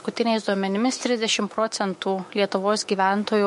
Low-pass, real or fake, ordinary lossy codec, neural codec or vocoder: 14.4 kHz; fake; MP3, 48 kbps; autoencoder, 48 kHz, 128 numbers a frame, DAC-VAE, trained on Japanese speech